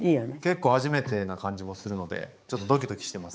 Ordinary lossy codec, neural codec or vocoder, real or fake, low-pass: none; codec, 16 kHz, 4 kbps, X-Codec, HuBERT features, trained on balanced general audio; fake; none